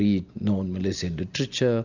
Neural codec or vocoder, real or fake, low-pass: none; real; 7.2 kHz